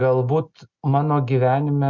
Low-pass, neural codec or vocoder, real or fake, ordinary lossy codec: 7.2 kHz; none; real; Opus, 64 kbps